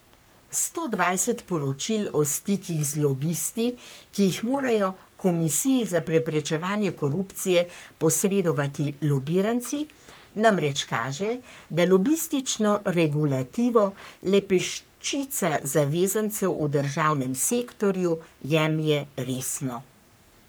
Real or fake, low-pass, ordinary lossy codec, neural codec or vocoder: fake; none; none; codec, 44.1 kHz, 3.4 kbps, Pupu-Codec